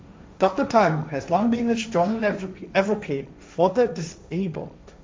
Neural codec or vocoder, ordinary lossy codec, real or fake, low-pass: codec, 16 kHz, 1.1 kbps, Voila-Tokenizer; none; fake; none